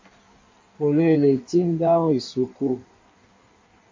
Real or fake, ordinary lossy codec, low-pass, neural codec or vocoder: fake; MP3, 48 kbps; 7.2 kHz; codec, 16 kHz in and 24 kHz out, 1.1 kbps, FireRedTTS-2 codec